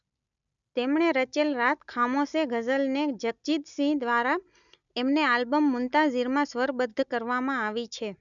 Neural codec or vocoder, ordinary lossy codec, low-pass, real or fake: none; none; 7.2 kHz; real